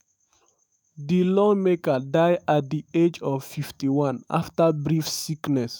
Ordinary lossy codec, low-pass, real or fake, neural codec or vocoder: none; none; fake; autoencoder, 48 kHz, 128 numbers a frame, DAC-VAE, trained on Japanese speech